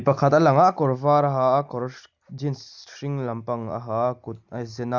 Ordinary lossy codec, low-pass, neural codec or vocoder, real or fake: Opus, 64 kbps; 7.2 kHz; none; real